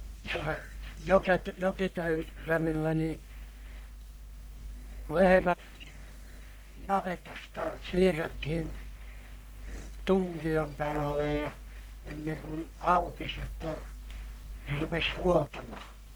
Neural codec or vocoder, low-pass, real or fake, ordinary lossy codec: codec, 44.1 kHz, 1.7 kbps, Pupu-Codec; none; fake; none